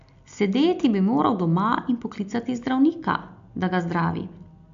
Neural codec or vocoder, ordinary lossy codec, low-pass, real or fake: none; AAC, 64 kbps; 7.2 kHz; real